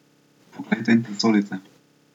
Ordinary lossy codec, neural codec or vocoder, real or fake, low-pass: none; none; real; 19.8 kHz